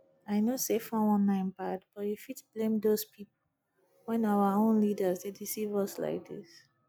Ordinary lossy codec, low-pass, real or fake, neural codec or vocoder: none; none; real; none